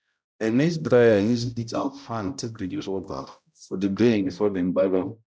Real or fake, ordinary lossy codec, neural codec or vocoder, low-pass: fake; none; codec, 16 kHz, 0.5 kbps, X-Codec, HuBERT features, trained on balanced general audio; none